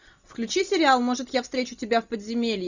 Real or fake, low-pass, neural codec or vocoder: real; 7.2 kHz; none